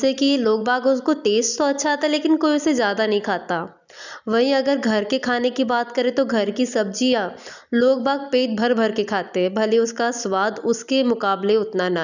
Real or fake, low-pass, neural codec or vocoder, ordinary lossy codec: real; 7.2 kHz; none; none